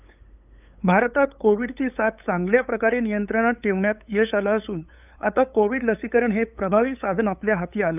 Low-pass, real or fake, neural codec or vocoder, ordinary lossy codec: 3.6 kHz; fake; codec, 16 kHz, 8 kbps, FunCodec, trained on LibriTTS, 25 frames a second; none